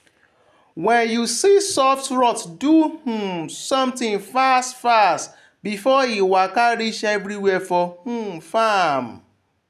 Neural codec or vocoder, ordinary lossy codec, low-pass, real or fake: none; none; 14.4 kHz; real